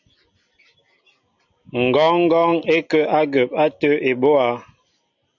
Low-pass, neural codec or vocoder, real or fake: 7.2 kHz; none; real